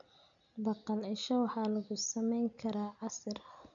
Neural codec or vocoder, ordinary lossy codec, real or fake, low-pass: none; none; real; 7.2 kHz